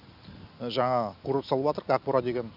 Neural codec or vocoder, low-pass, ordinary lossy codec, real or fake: none; 5.4 kHz; none; real